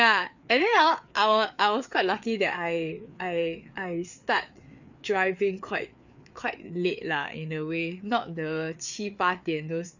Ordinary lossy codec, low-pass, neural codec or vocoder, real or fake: none; 7.2 kHz; codec, 16 kHz, 4 kbps, FreqCodec, larger model; fake